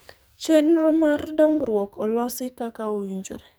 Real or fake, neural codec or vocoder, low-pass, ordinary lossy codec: fake; codec, 44.1 kHz, 2.6 kbps, SNAC; none; none